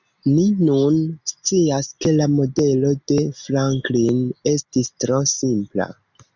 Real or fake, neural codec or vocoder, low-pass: real; none; 7.2 kHz